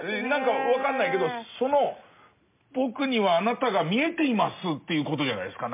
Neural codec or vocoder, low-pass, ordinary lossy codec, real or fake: none; 3.6 kHz; MP3, 32 kbps; real